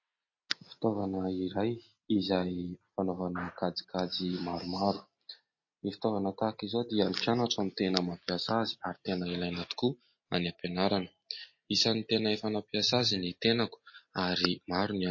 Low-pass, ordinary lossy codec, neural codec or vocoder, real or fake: 7.2 kHz; MP3, 32 kbps; none; real